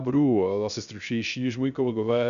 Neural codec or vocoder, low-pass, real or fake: codec, 16 kHz, 0.3 kbps, FocalCodec; 7.2 kHz; fake